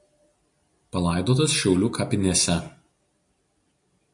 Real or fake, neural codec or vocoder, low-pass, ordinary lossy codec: real; none; 10.8 kHz; MP3, 48 kbps